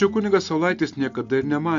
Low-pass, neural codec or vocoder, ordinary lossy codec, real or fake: 7.2 kHz; none; AAC, 64 kbps; real